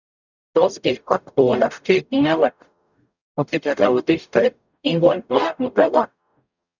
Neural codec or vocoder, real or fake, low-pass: codec, 44.1 kHz, 0.9 kbps, DAC; fake; 7.2 kHz